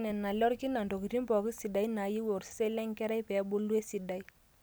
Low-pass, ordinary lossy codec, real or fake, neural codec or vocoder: none; none; real; none